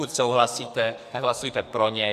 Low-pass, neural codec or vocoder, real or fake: 14.4 kHz; codec, 44.1 kHz, 2.6 kbps, SNAC; fake